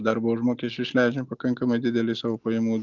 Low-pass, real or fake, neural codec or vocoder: 7.2 kHz; real; none